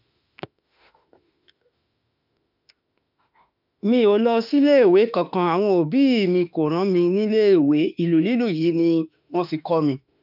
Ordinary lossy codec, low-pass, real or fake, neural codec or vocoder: none; 5.4 kHz; fake; autoencoder, 48 kHz, 32 numbers a frame, DAC-VAE, trained on Japanese speech